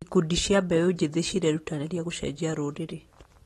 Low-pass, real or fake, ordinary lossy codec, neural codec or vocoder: 19.8 kHz; real; AAC, 32 kbps; none